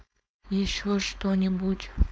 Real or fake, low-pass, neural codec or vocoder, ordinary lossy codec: fake; none; codec, 16 kHz, 4.8 kbps, FACodec; none